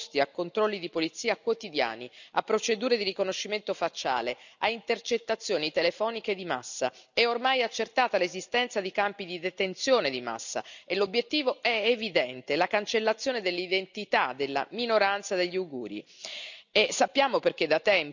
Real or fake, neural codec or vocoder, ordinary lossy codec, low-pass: real; none; none; 7.2 kHz